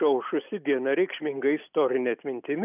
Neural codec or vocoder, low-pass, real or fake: none; 3.6 kHz; real